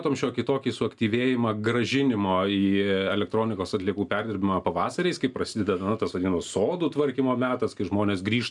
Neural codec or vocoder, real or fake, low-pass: none; real; 10.8 kHz